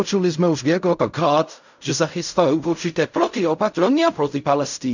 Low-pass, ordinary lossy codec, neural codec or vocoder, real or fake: 7.2 kHz; none; codec, 16 kHz in and 24 kHz out, 0.4 kbps, LongCat-Audio-Codec, fine tuned four codebook decoder; fake